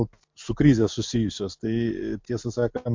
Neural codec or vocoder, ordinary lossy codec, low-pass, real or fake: none; MP3, 48 kbps; 7.2 kHz; real